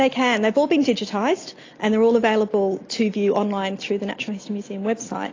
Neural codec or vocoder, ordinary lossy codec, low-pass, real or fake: none; AAC, 32 kbps; 7.2 kHz; real